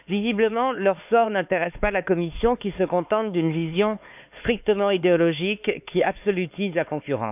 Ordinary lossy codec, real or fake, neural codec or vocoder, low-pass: none; fake; codec, 16 kHz, 4 kbps, X-Codec, HuBERT features, trained on LibriSpeech; 3.6 kHz